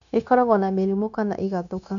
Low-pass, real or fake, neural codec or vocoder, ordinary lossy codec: 7.2 kHz; fake; codec, 16 kHz, 0.9 kbps, LongCat-Audio-Codec; none